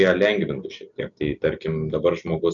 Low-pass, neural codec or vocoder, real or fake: 7.2 kHz; none; real